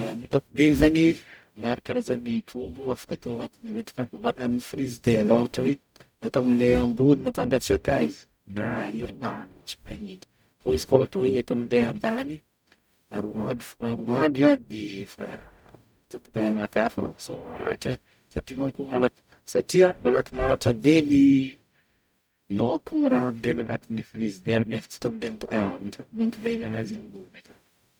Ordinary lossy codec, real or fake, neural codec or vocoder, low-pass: none; fake; codec, 44.1 kHz, 0.9 kbps, DAC; none